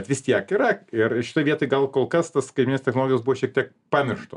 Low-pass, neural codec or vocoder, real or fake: 10.8 kHz; none; real